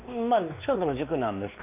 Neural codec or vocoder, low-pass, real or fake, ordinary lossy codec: codec, 16 kHz, 2 kbps, X-Codec, WavLM features, trained on Multilingual LibriSpeech; 3.6 kHz; fake; none